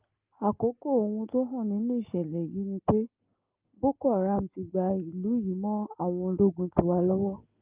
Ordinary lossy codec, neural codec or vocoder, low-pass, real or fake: Opus, 32 kbps; none; 3.6 kHz; real